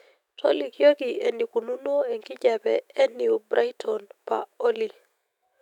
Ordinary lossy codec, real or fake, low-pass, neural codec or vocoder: none; fake; 19.8 kHz; autoencoder, 48 kHz, 128 numbers a frame, DAC-VAE, trained on Japanese speech